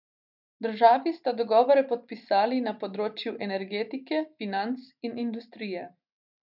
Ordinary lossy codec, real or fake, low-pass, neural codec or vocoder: none; real; 5.4 kHz; none